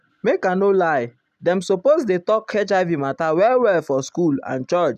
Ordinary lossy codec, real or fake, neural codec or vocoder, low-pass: none; real; none; 14.4 kHz